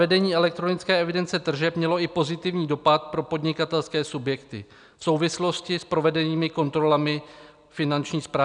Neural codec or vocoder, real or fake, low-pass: none; real; 9.9 kHz